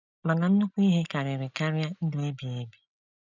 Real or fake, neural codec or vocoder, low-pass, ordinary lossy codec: real; none; 7.2 kHz; none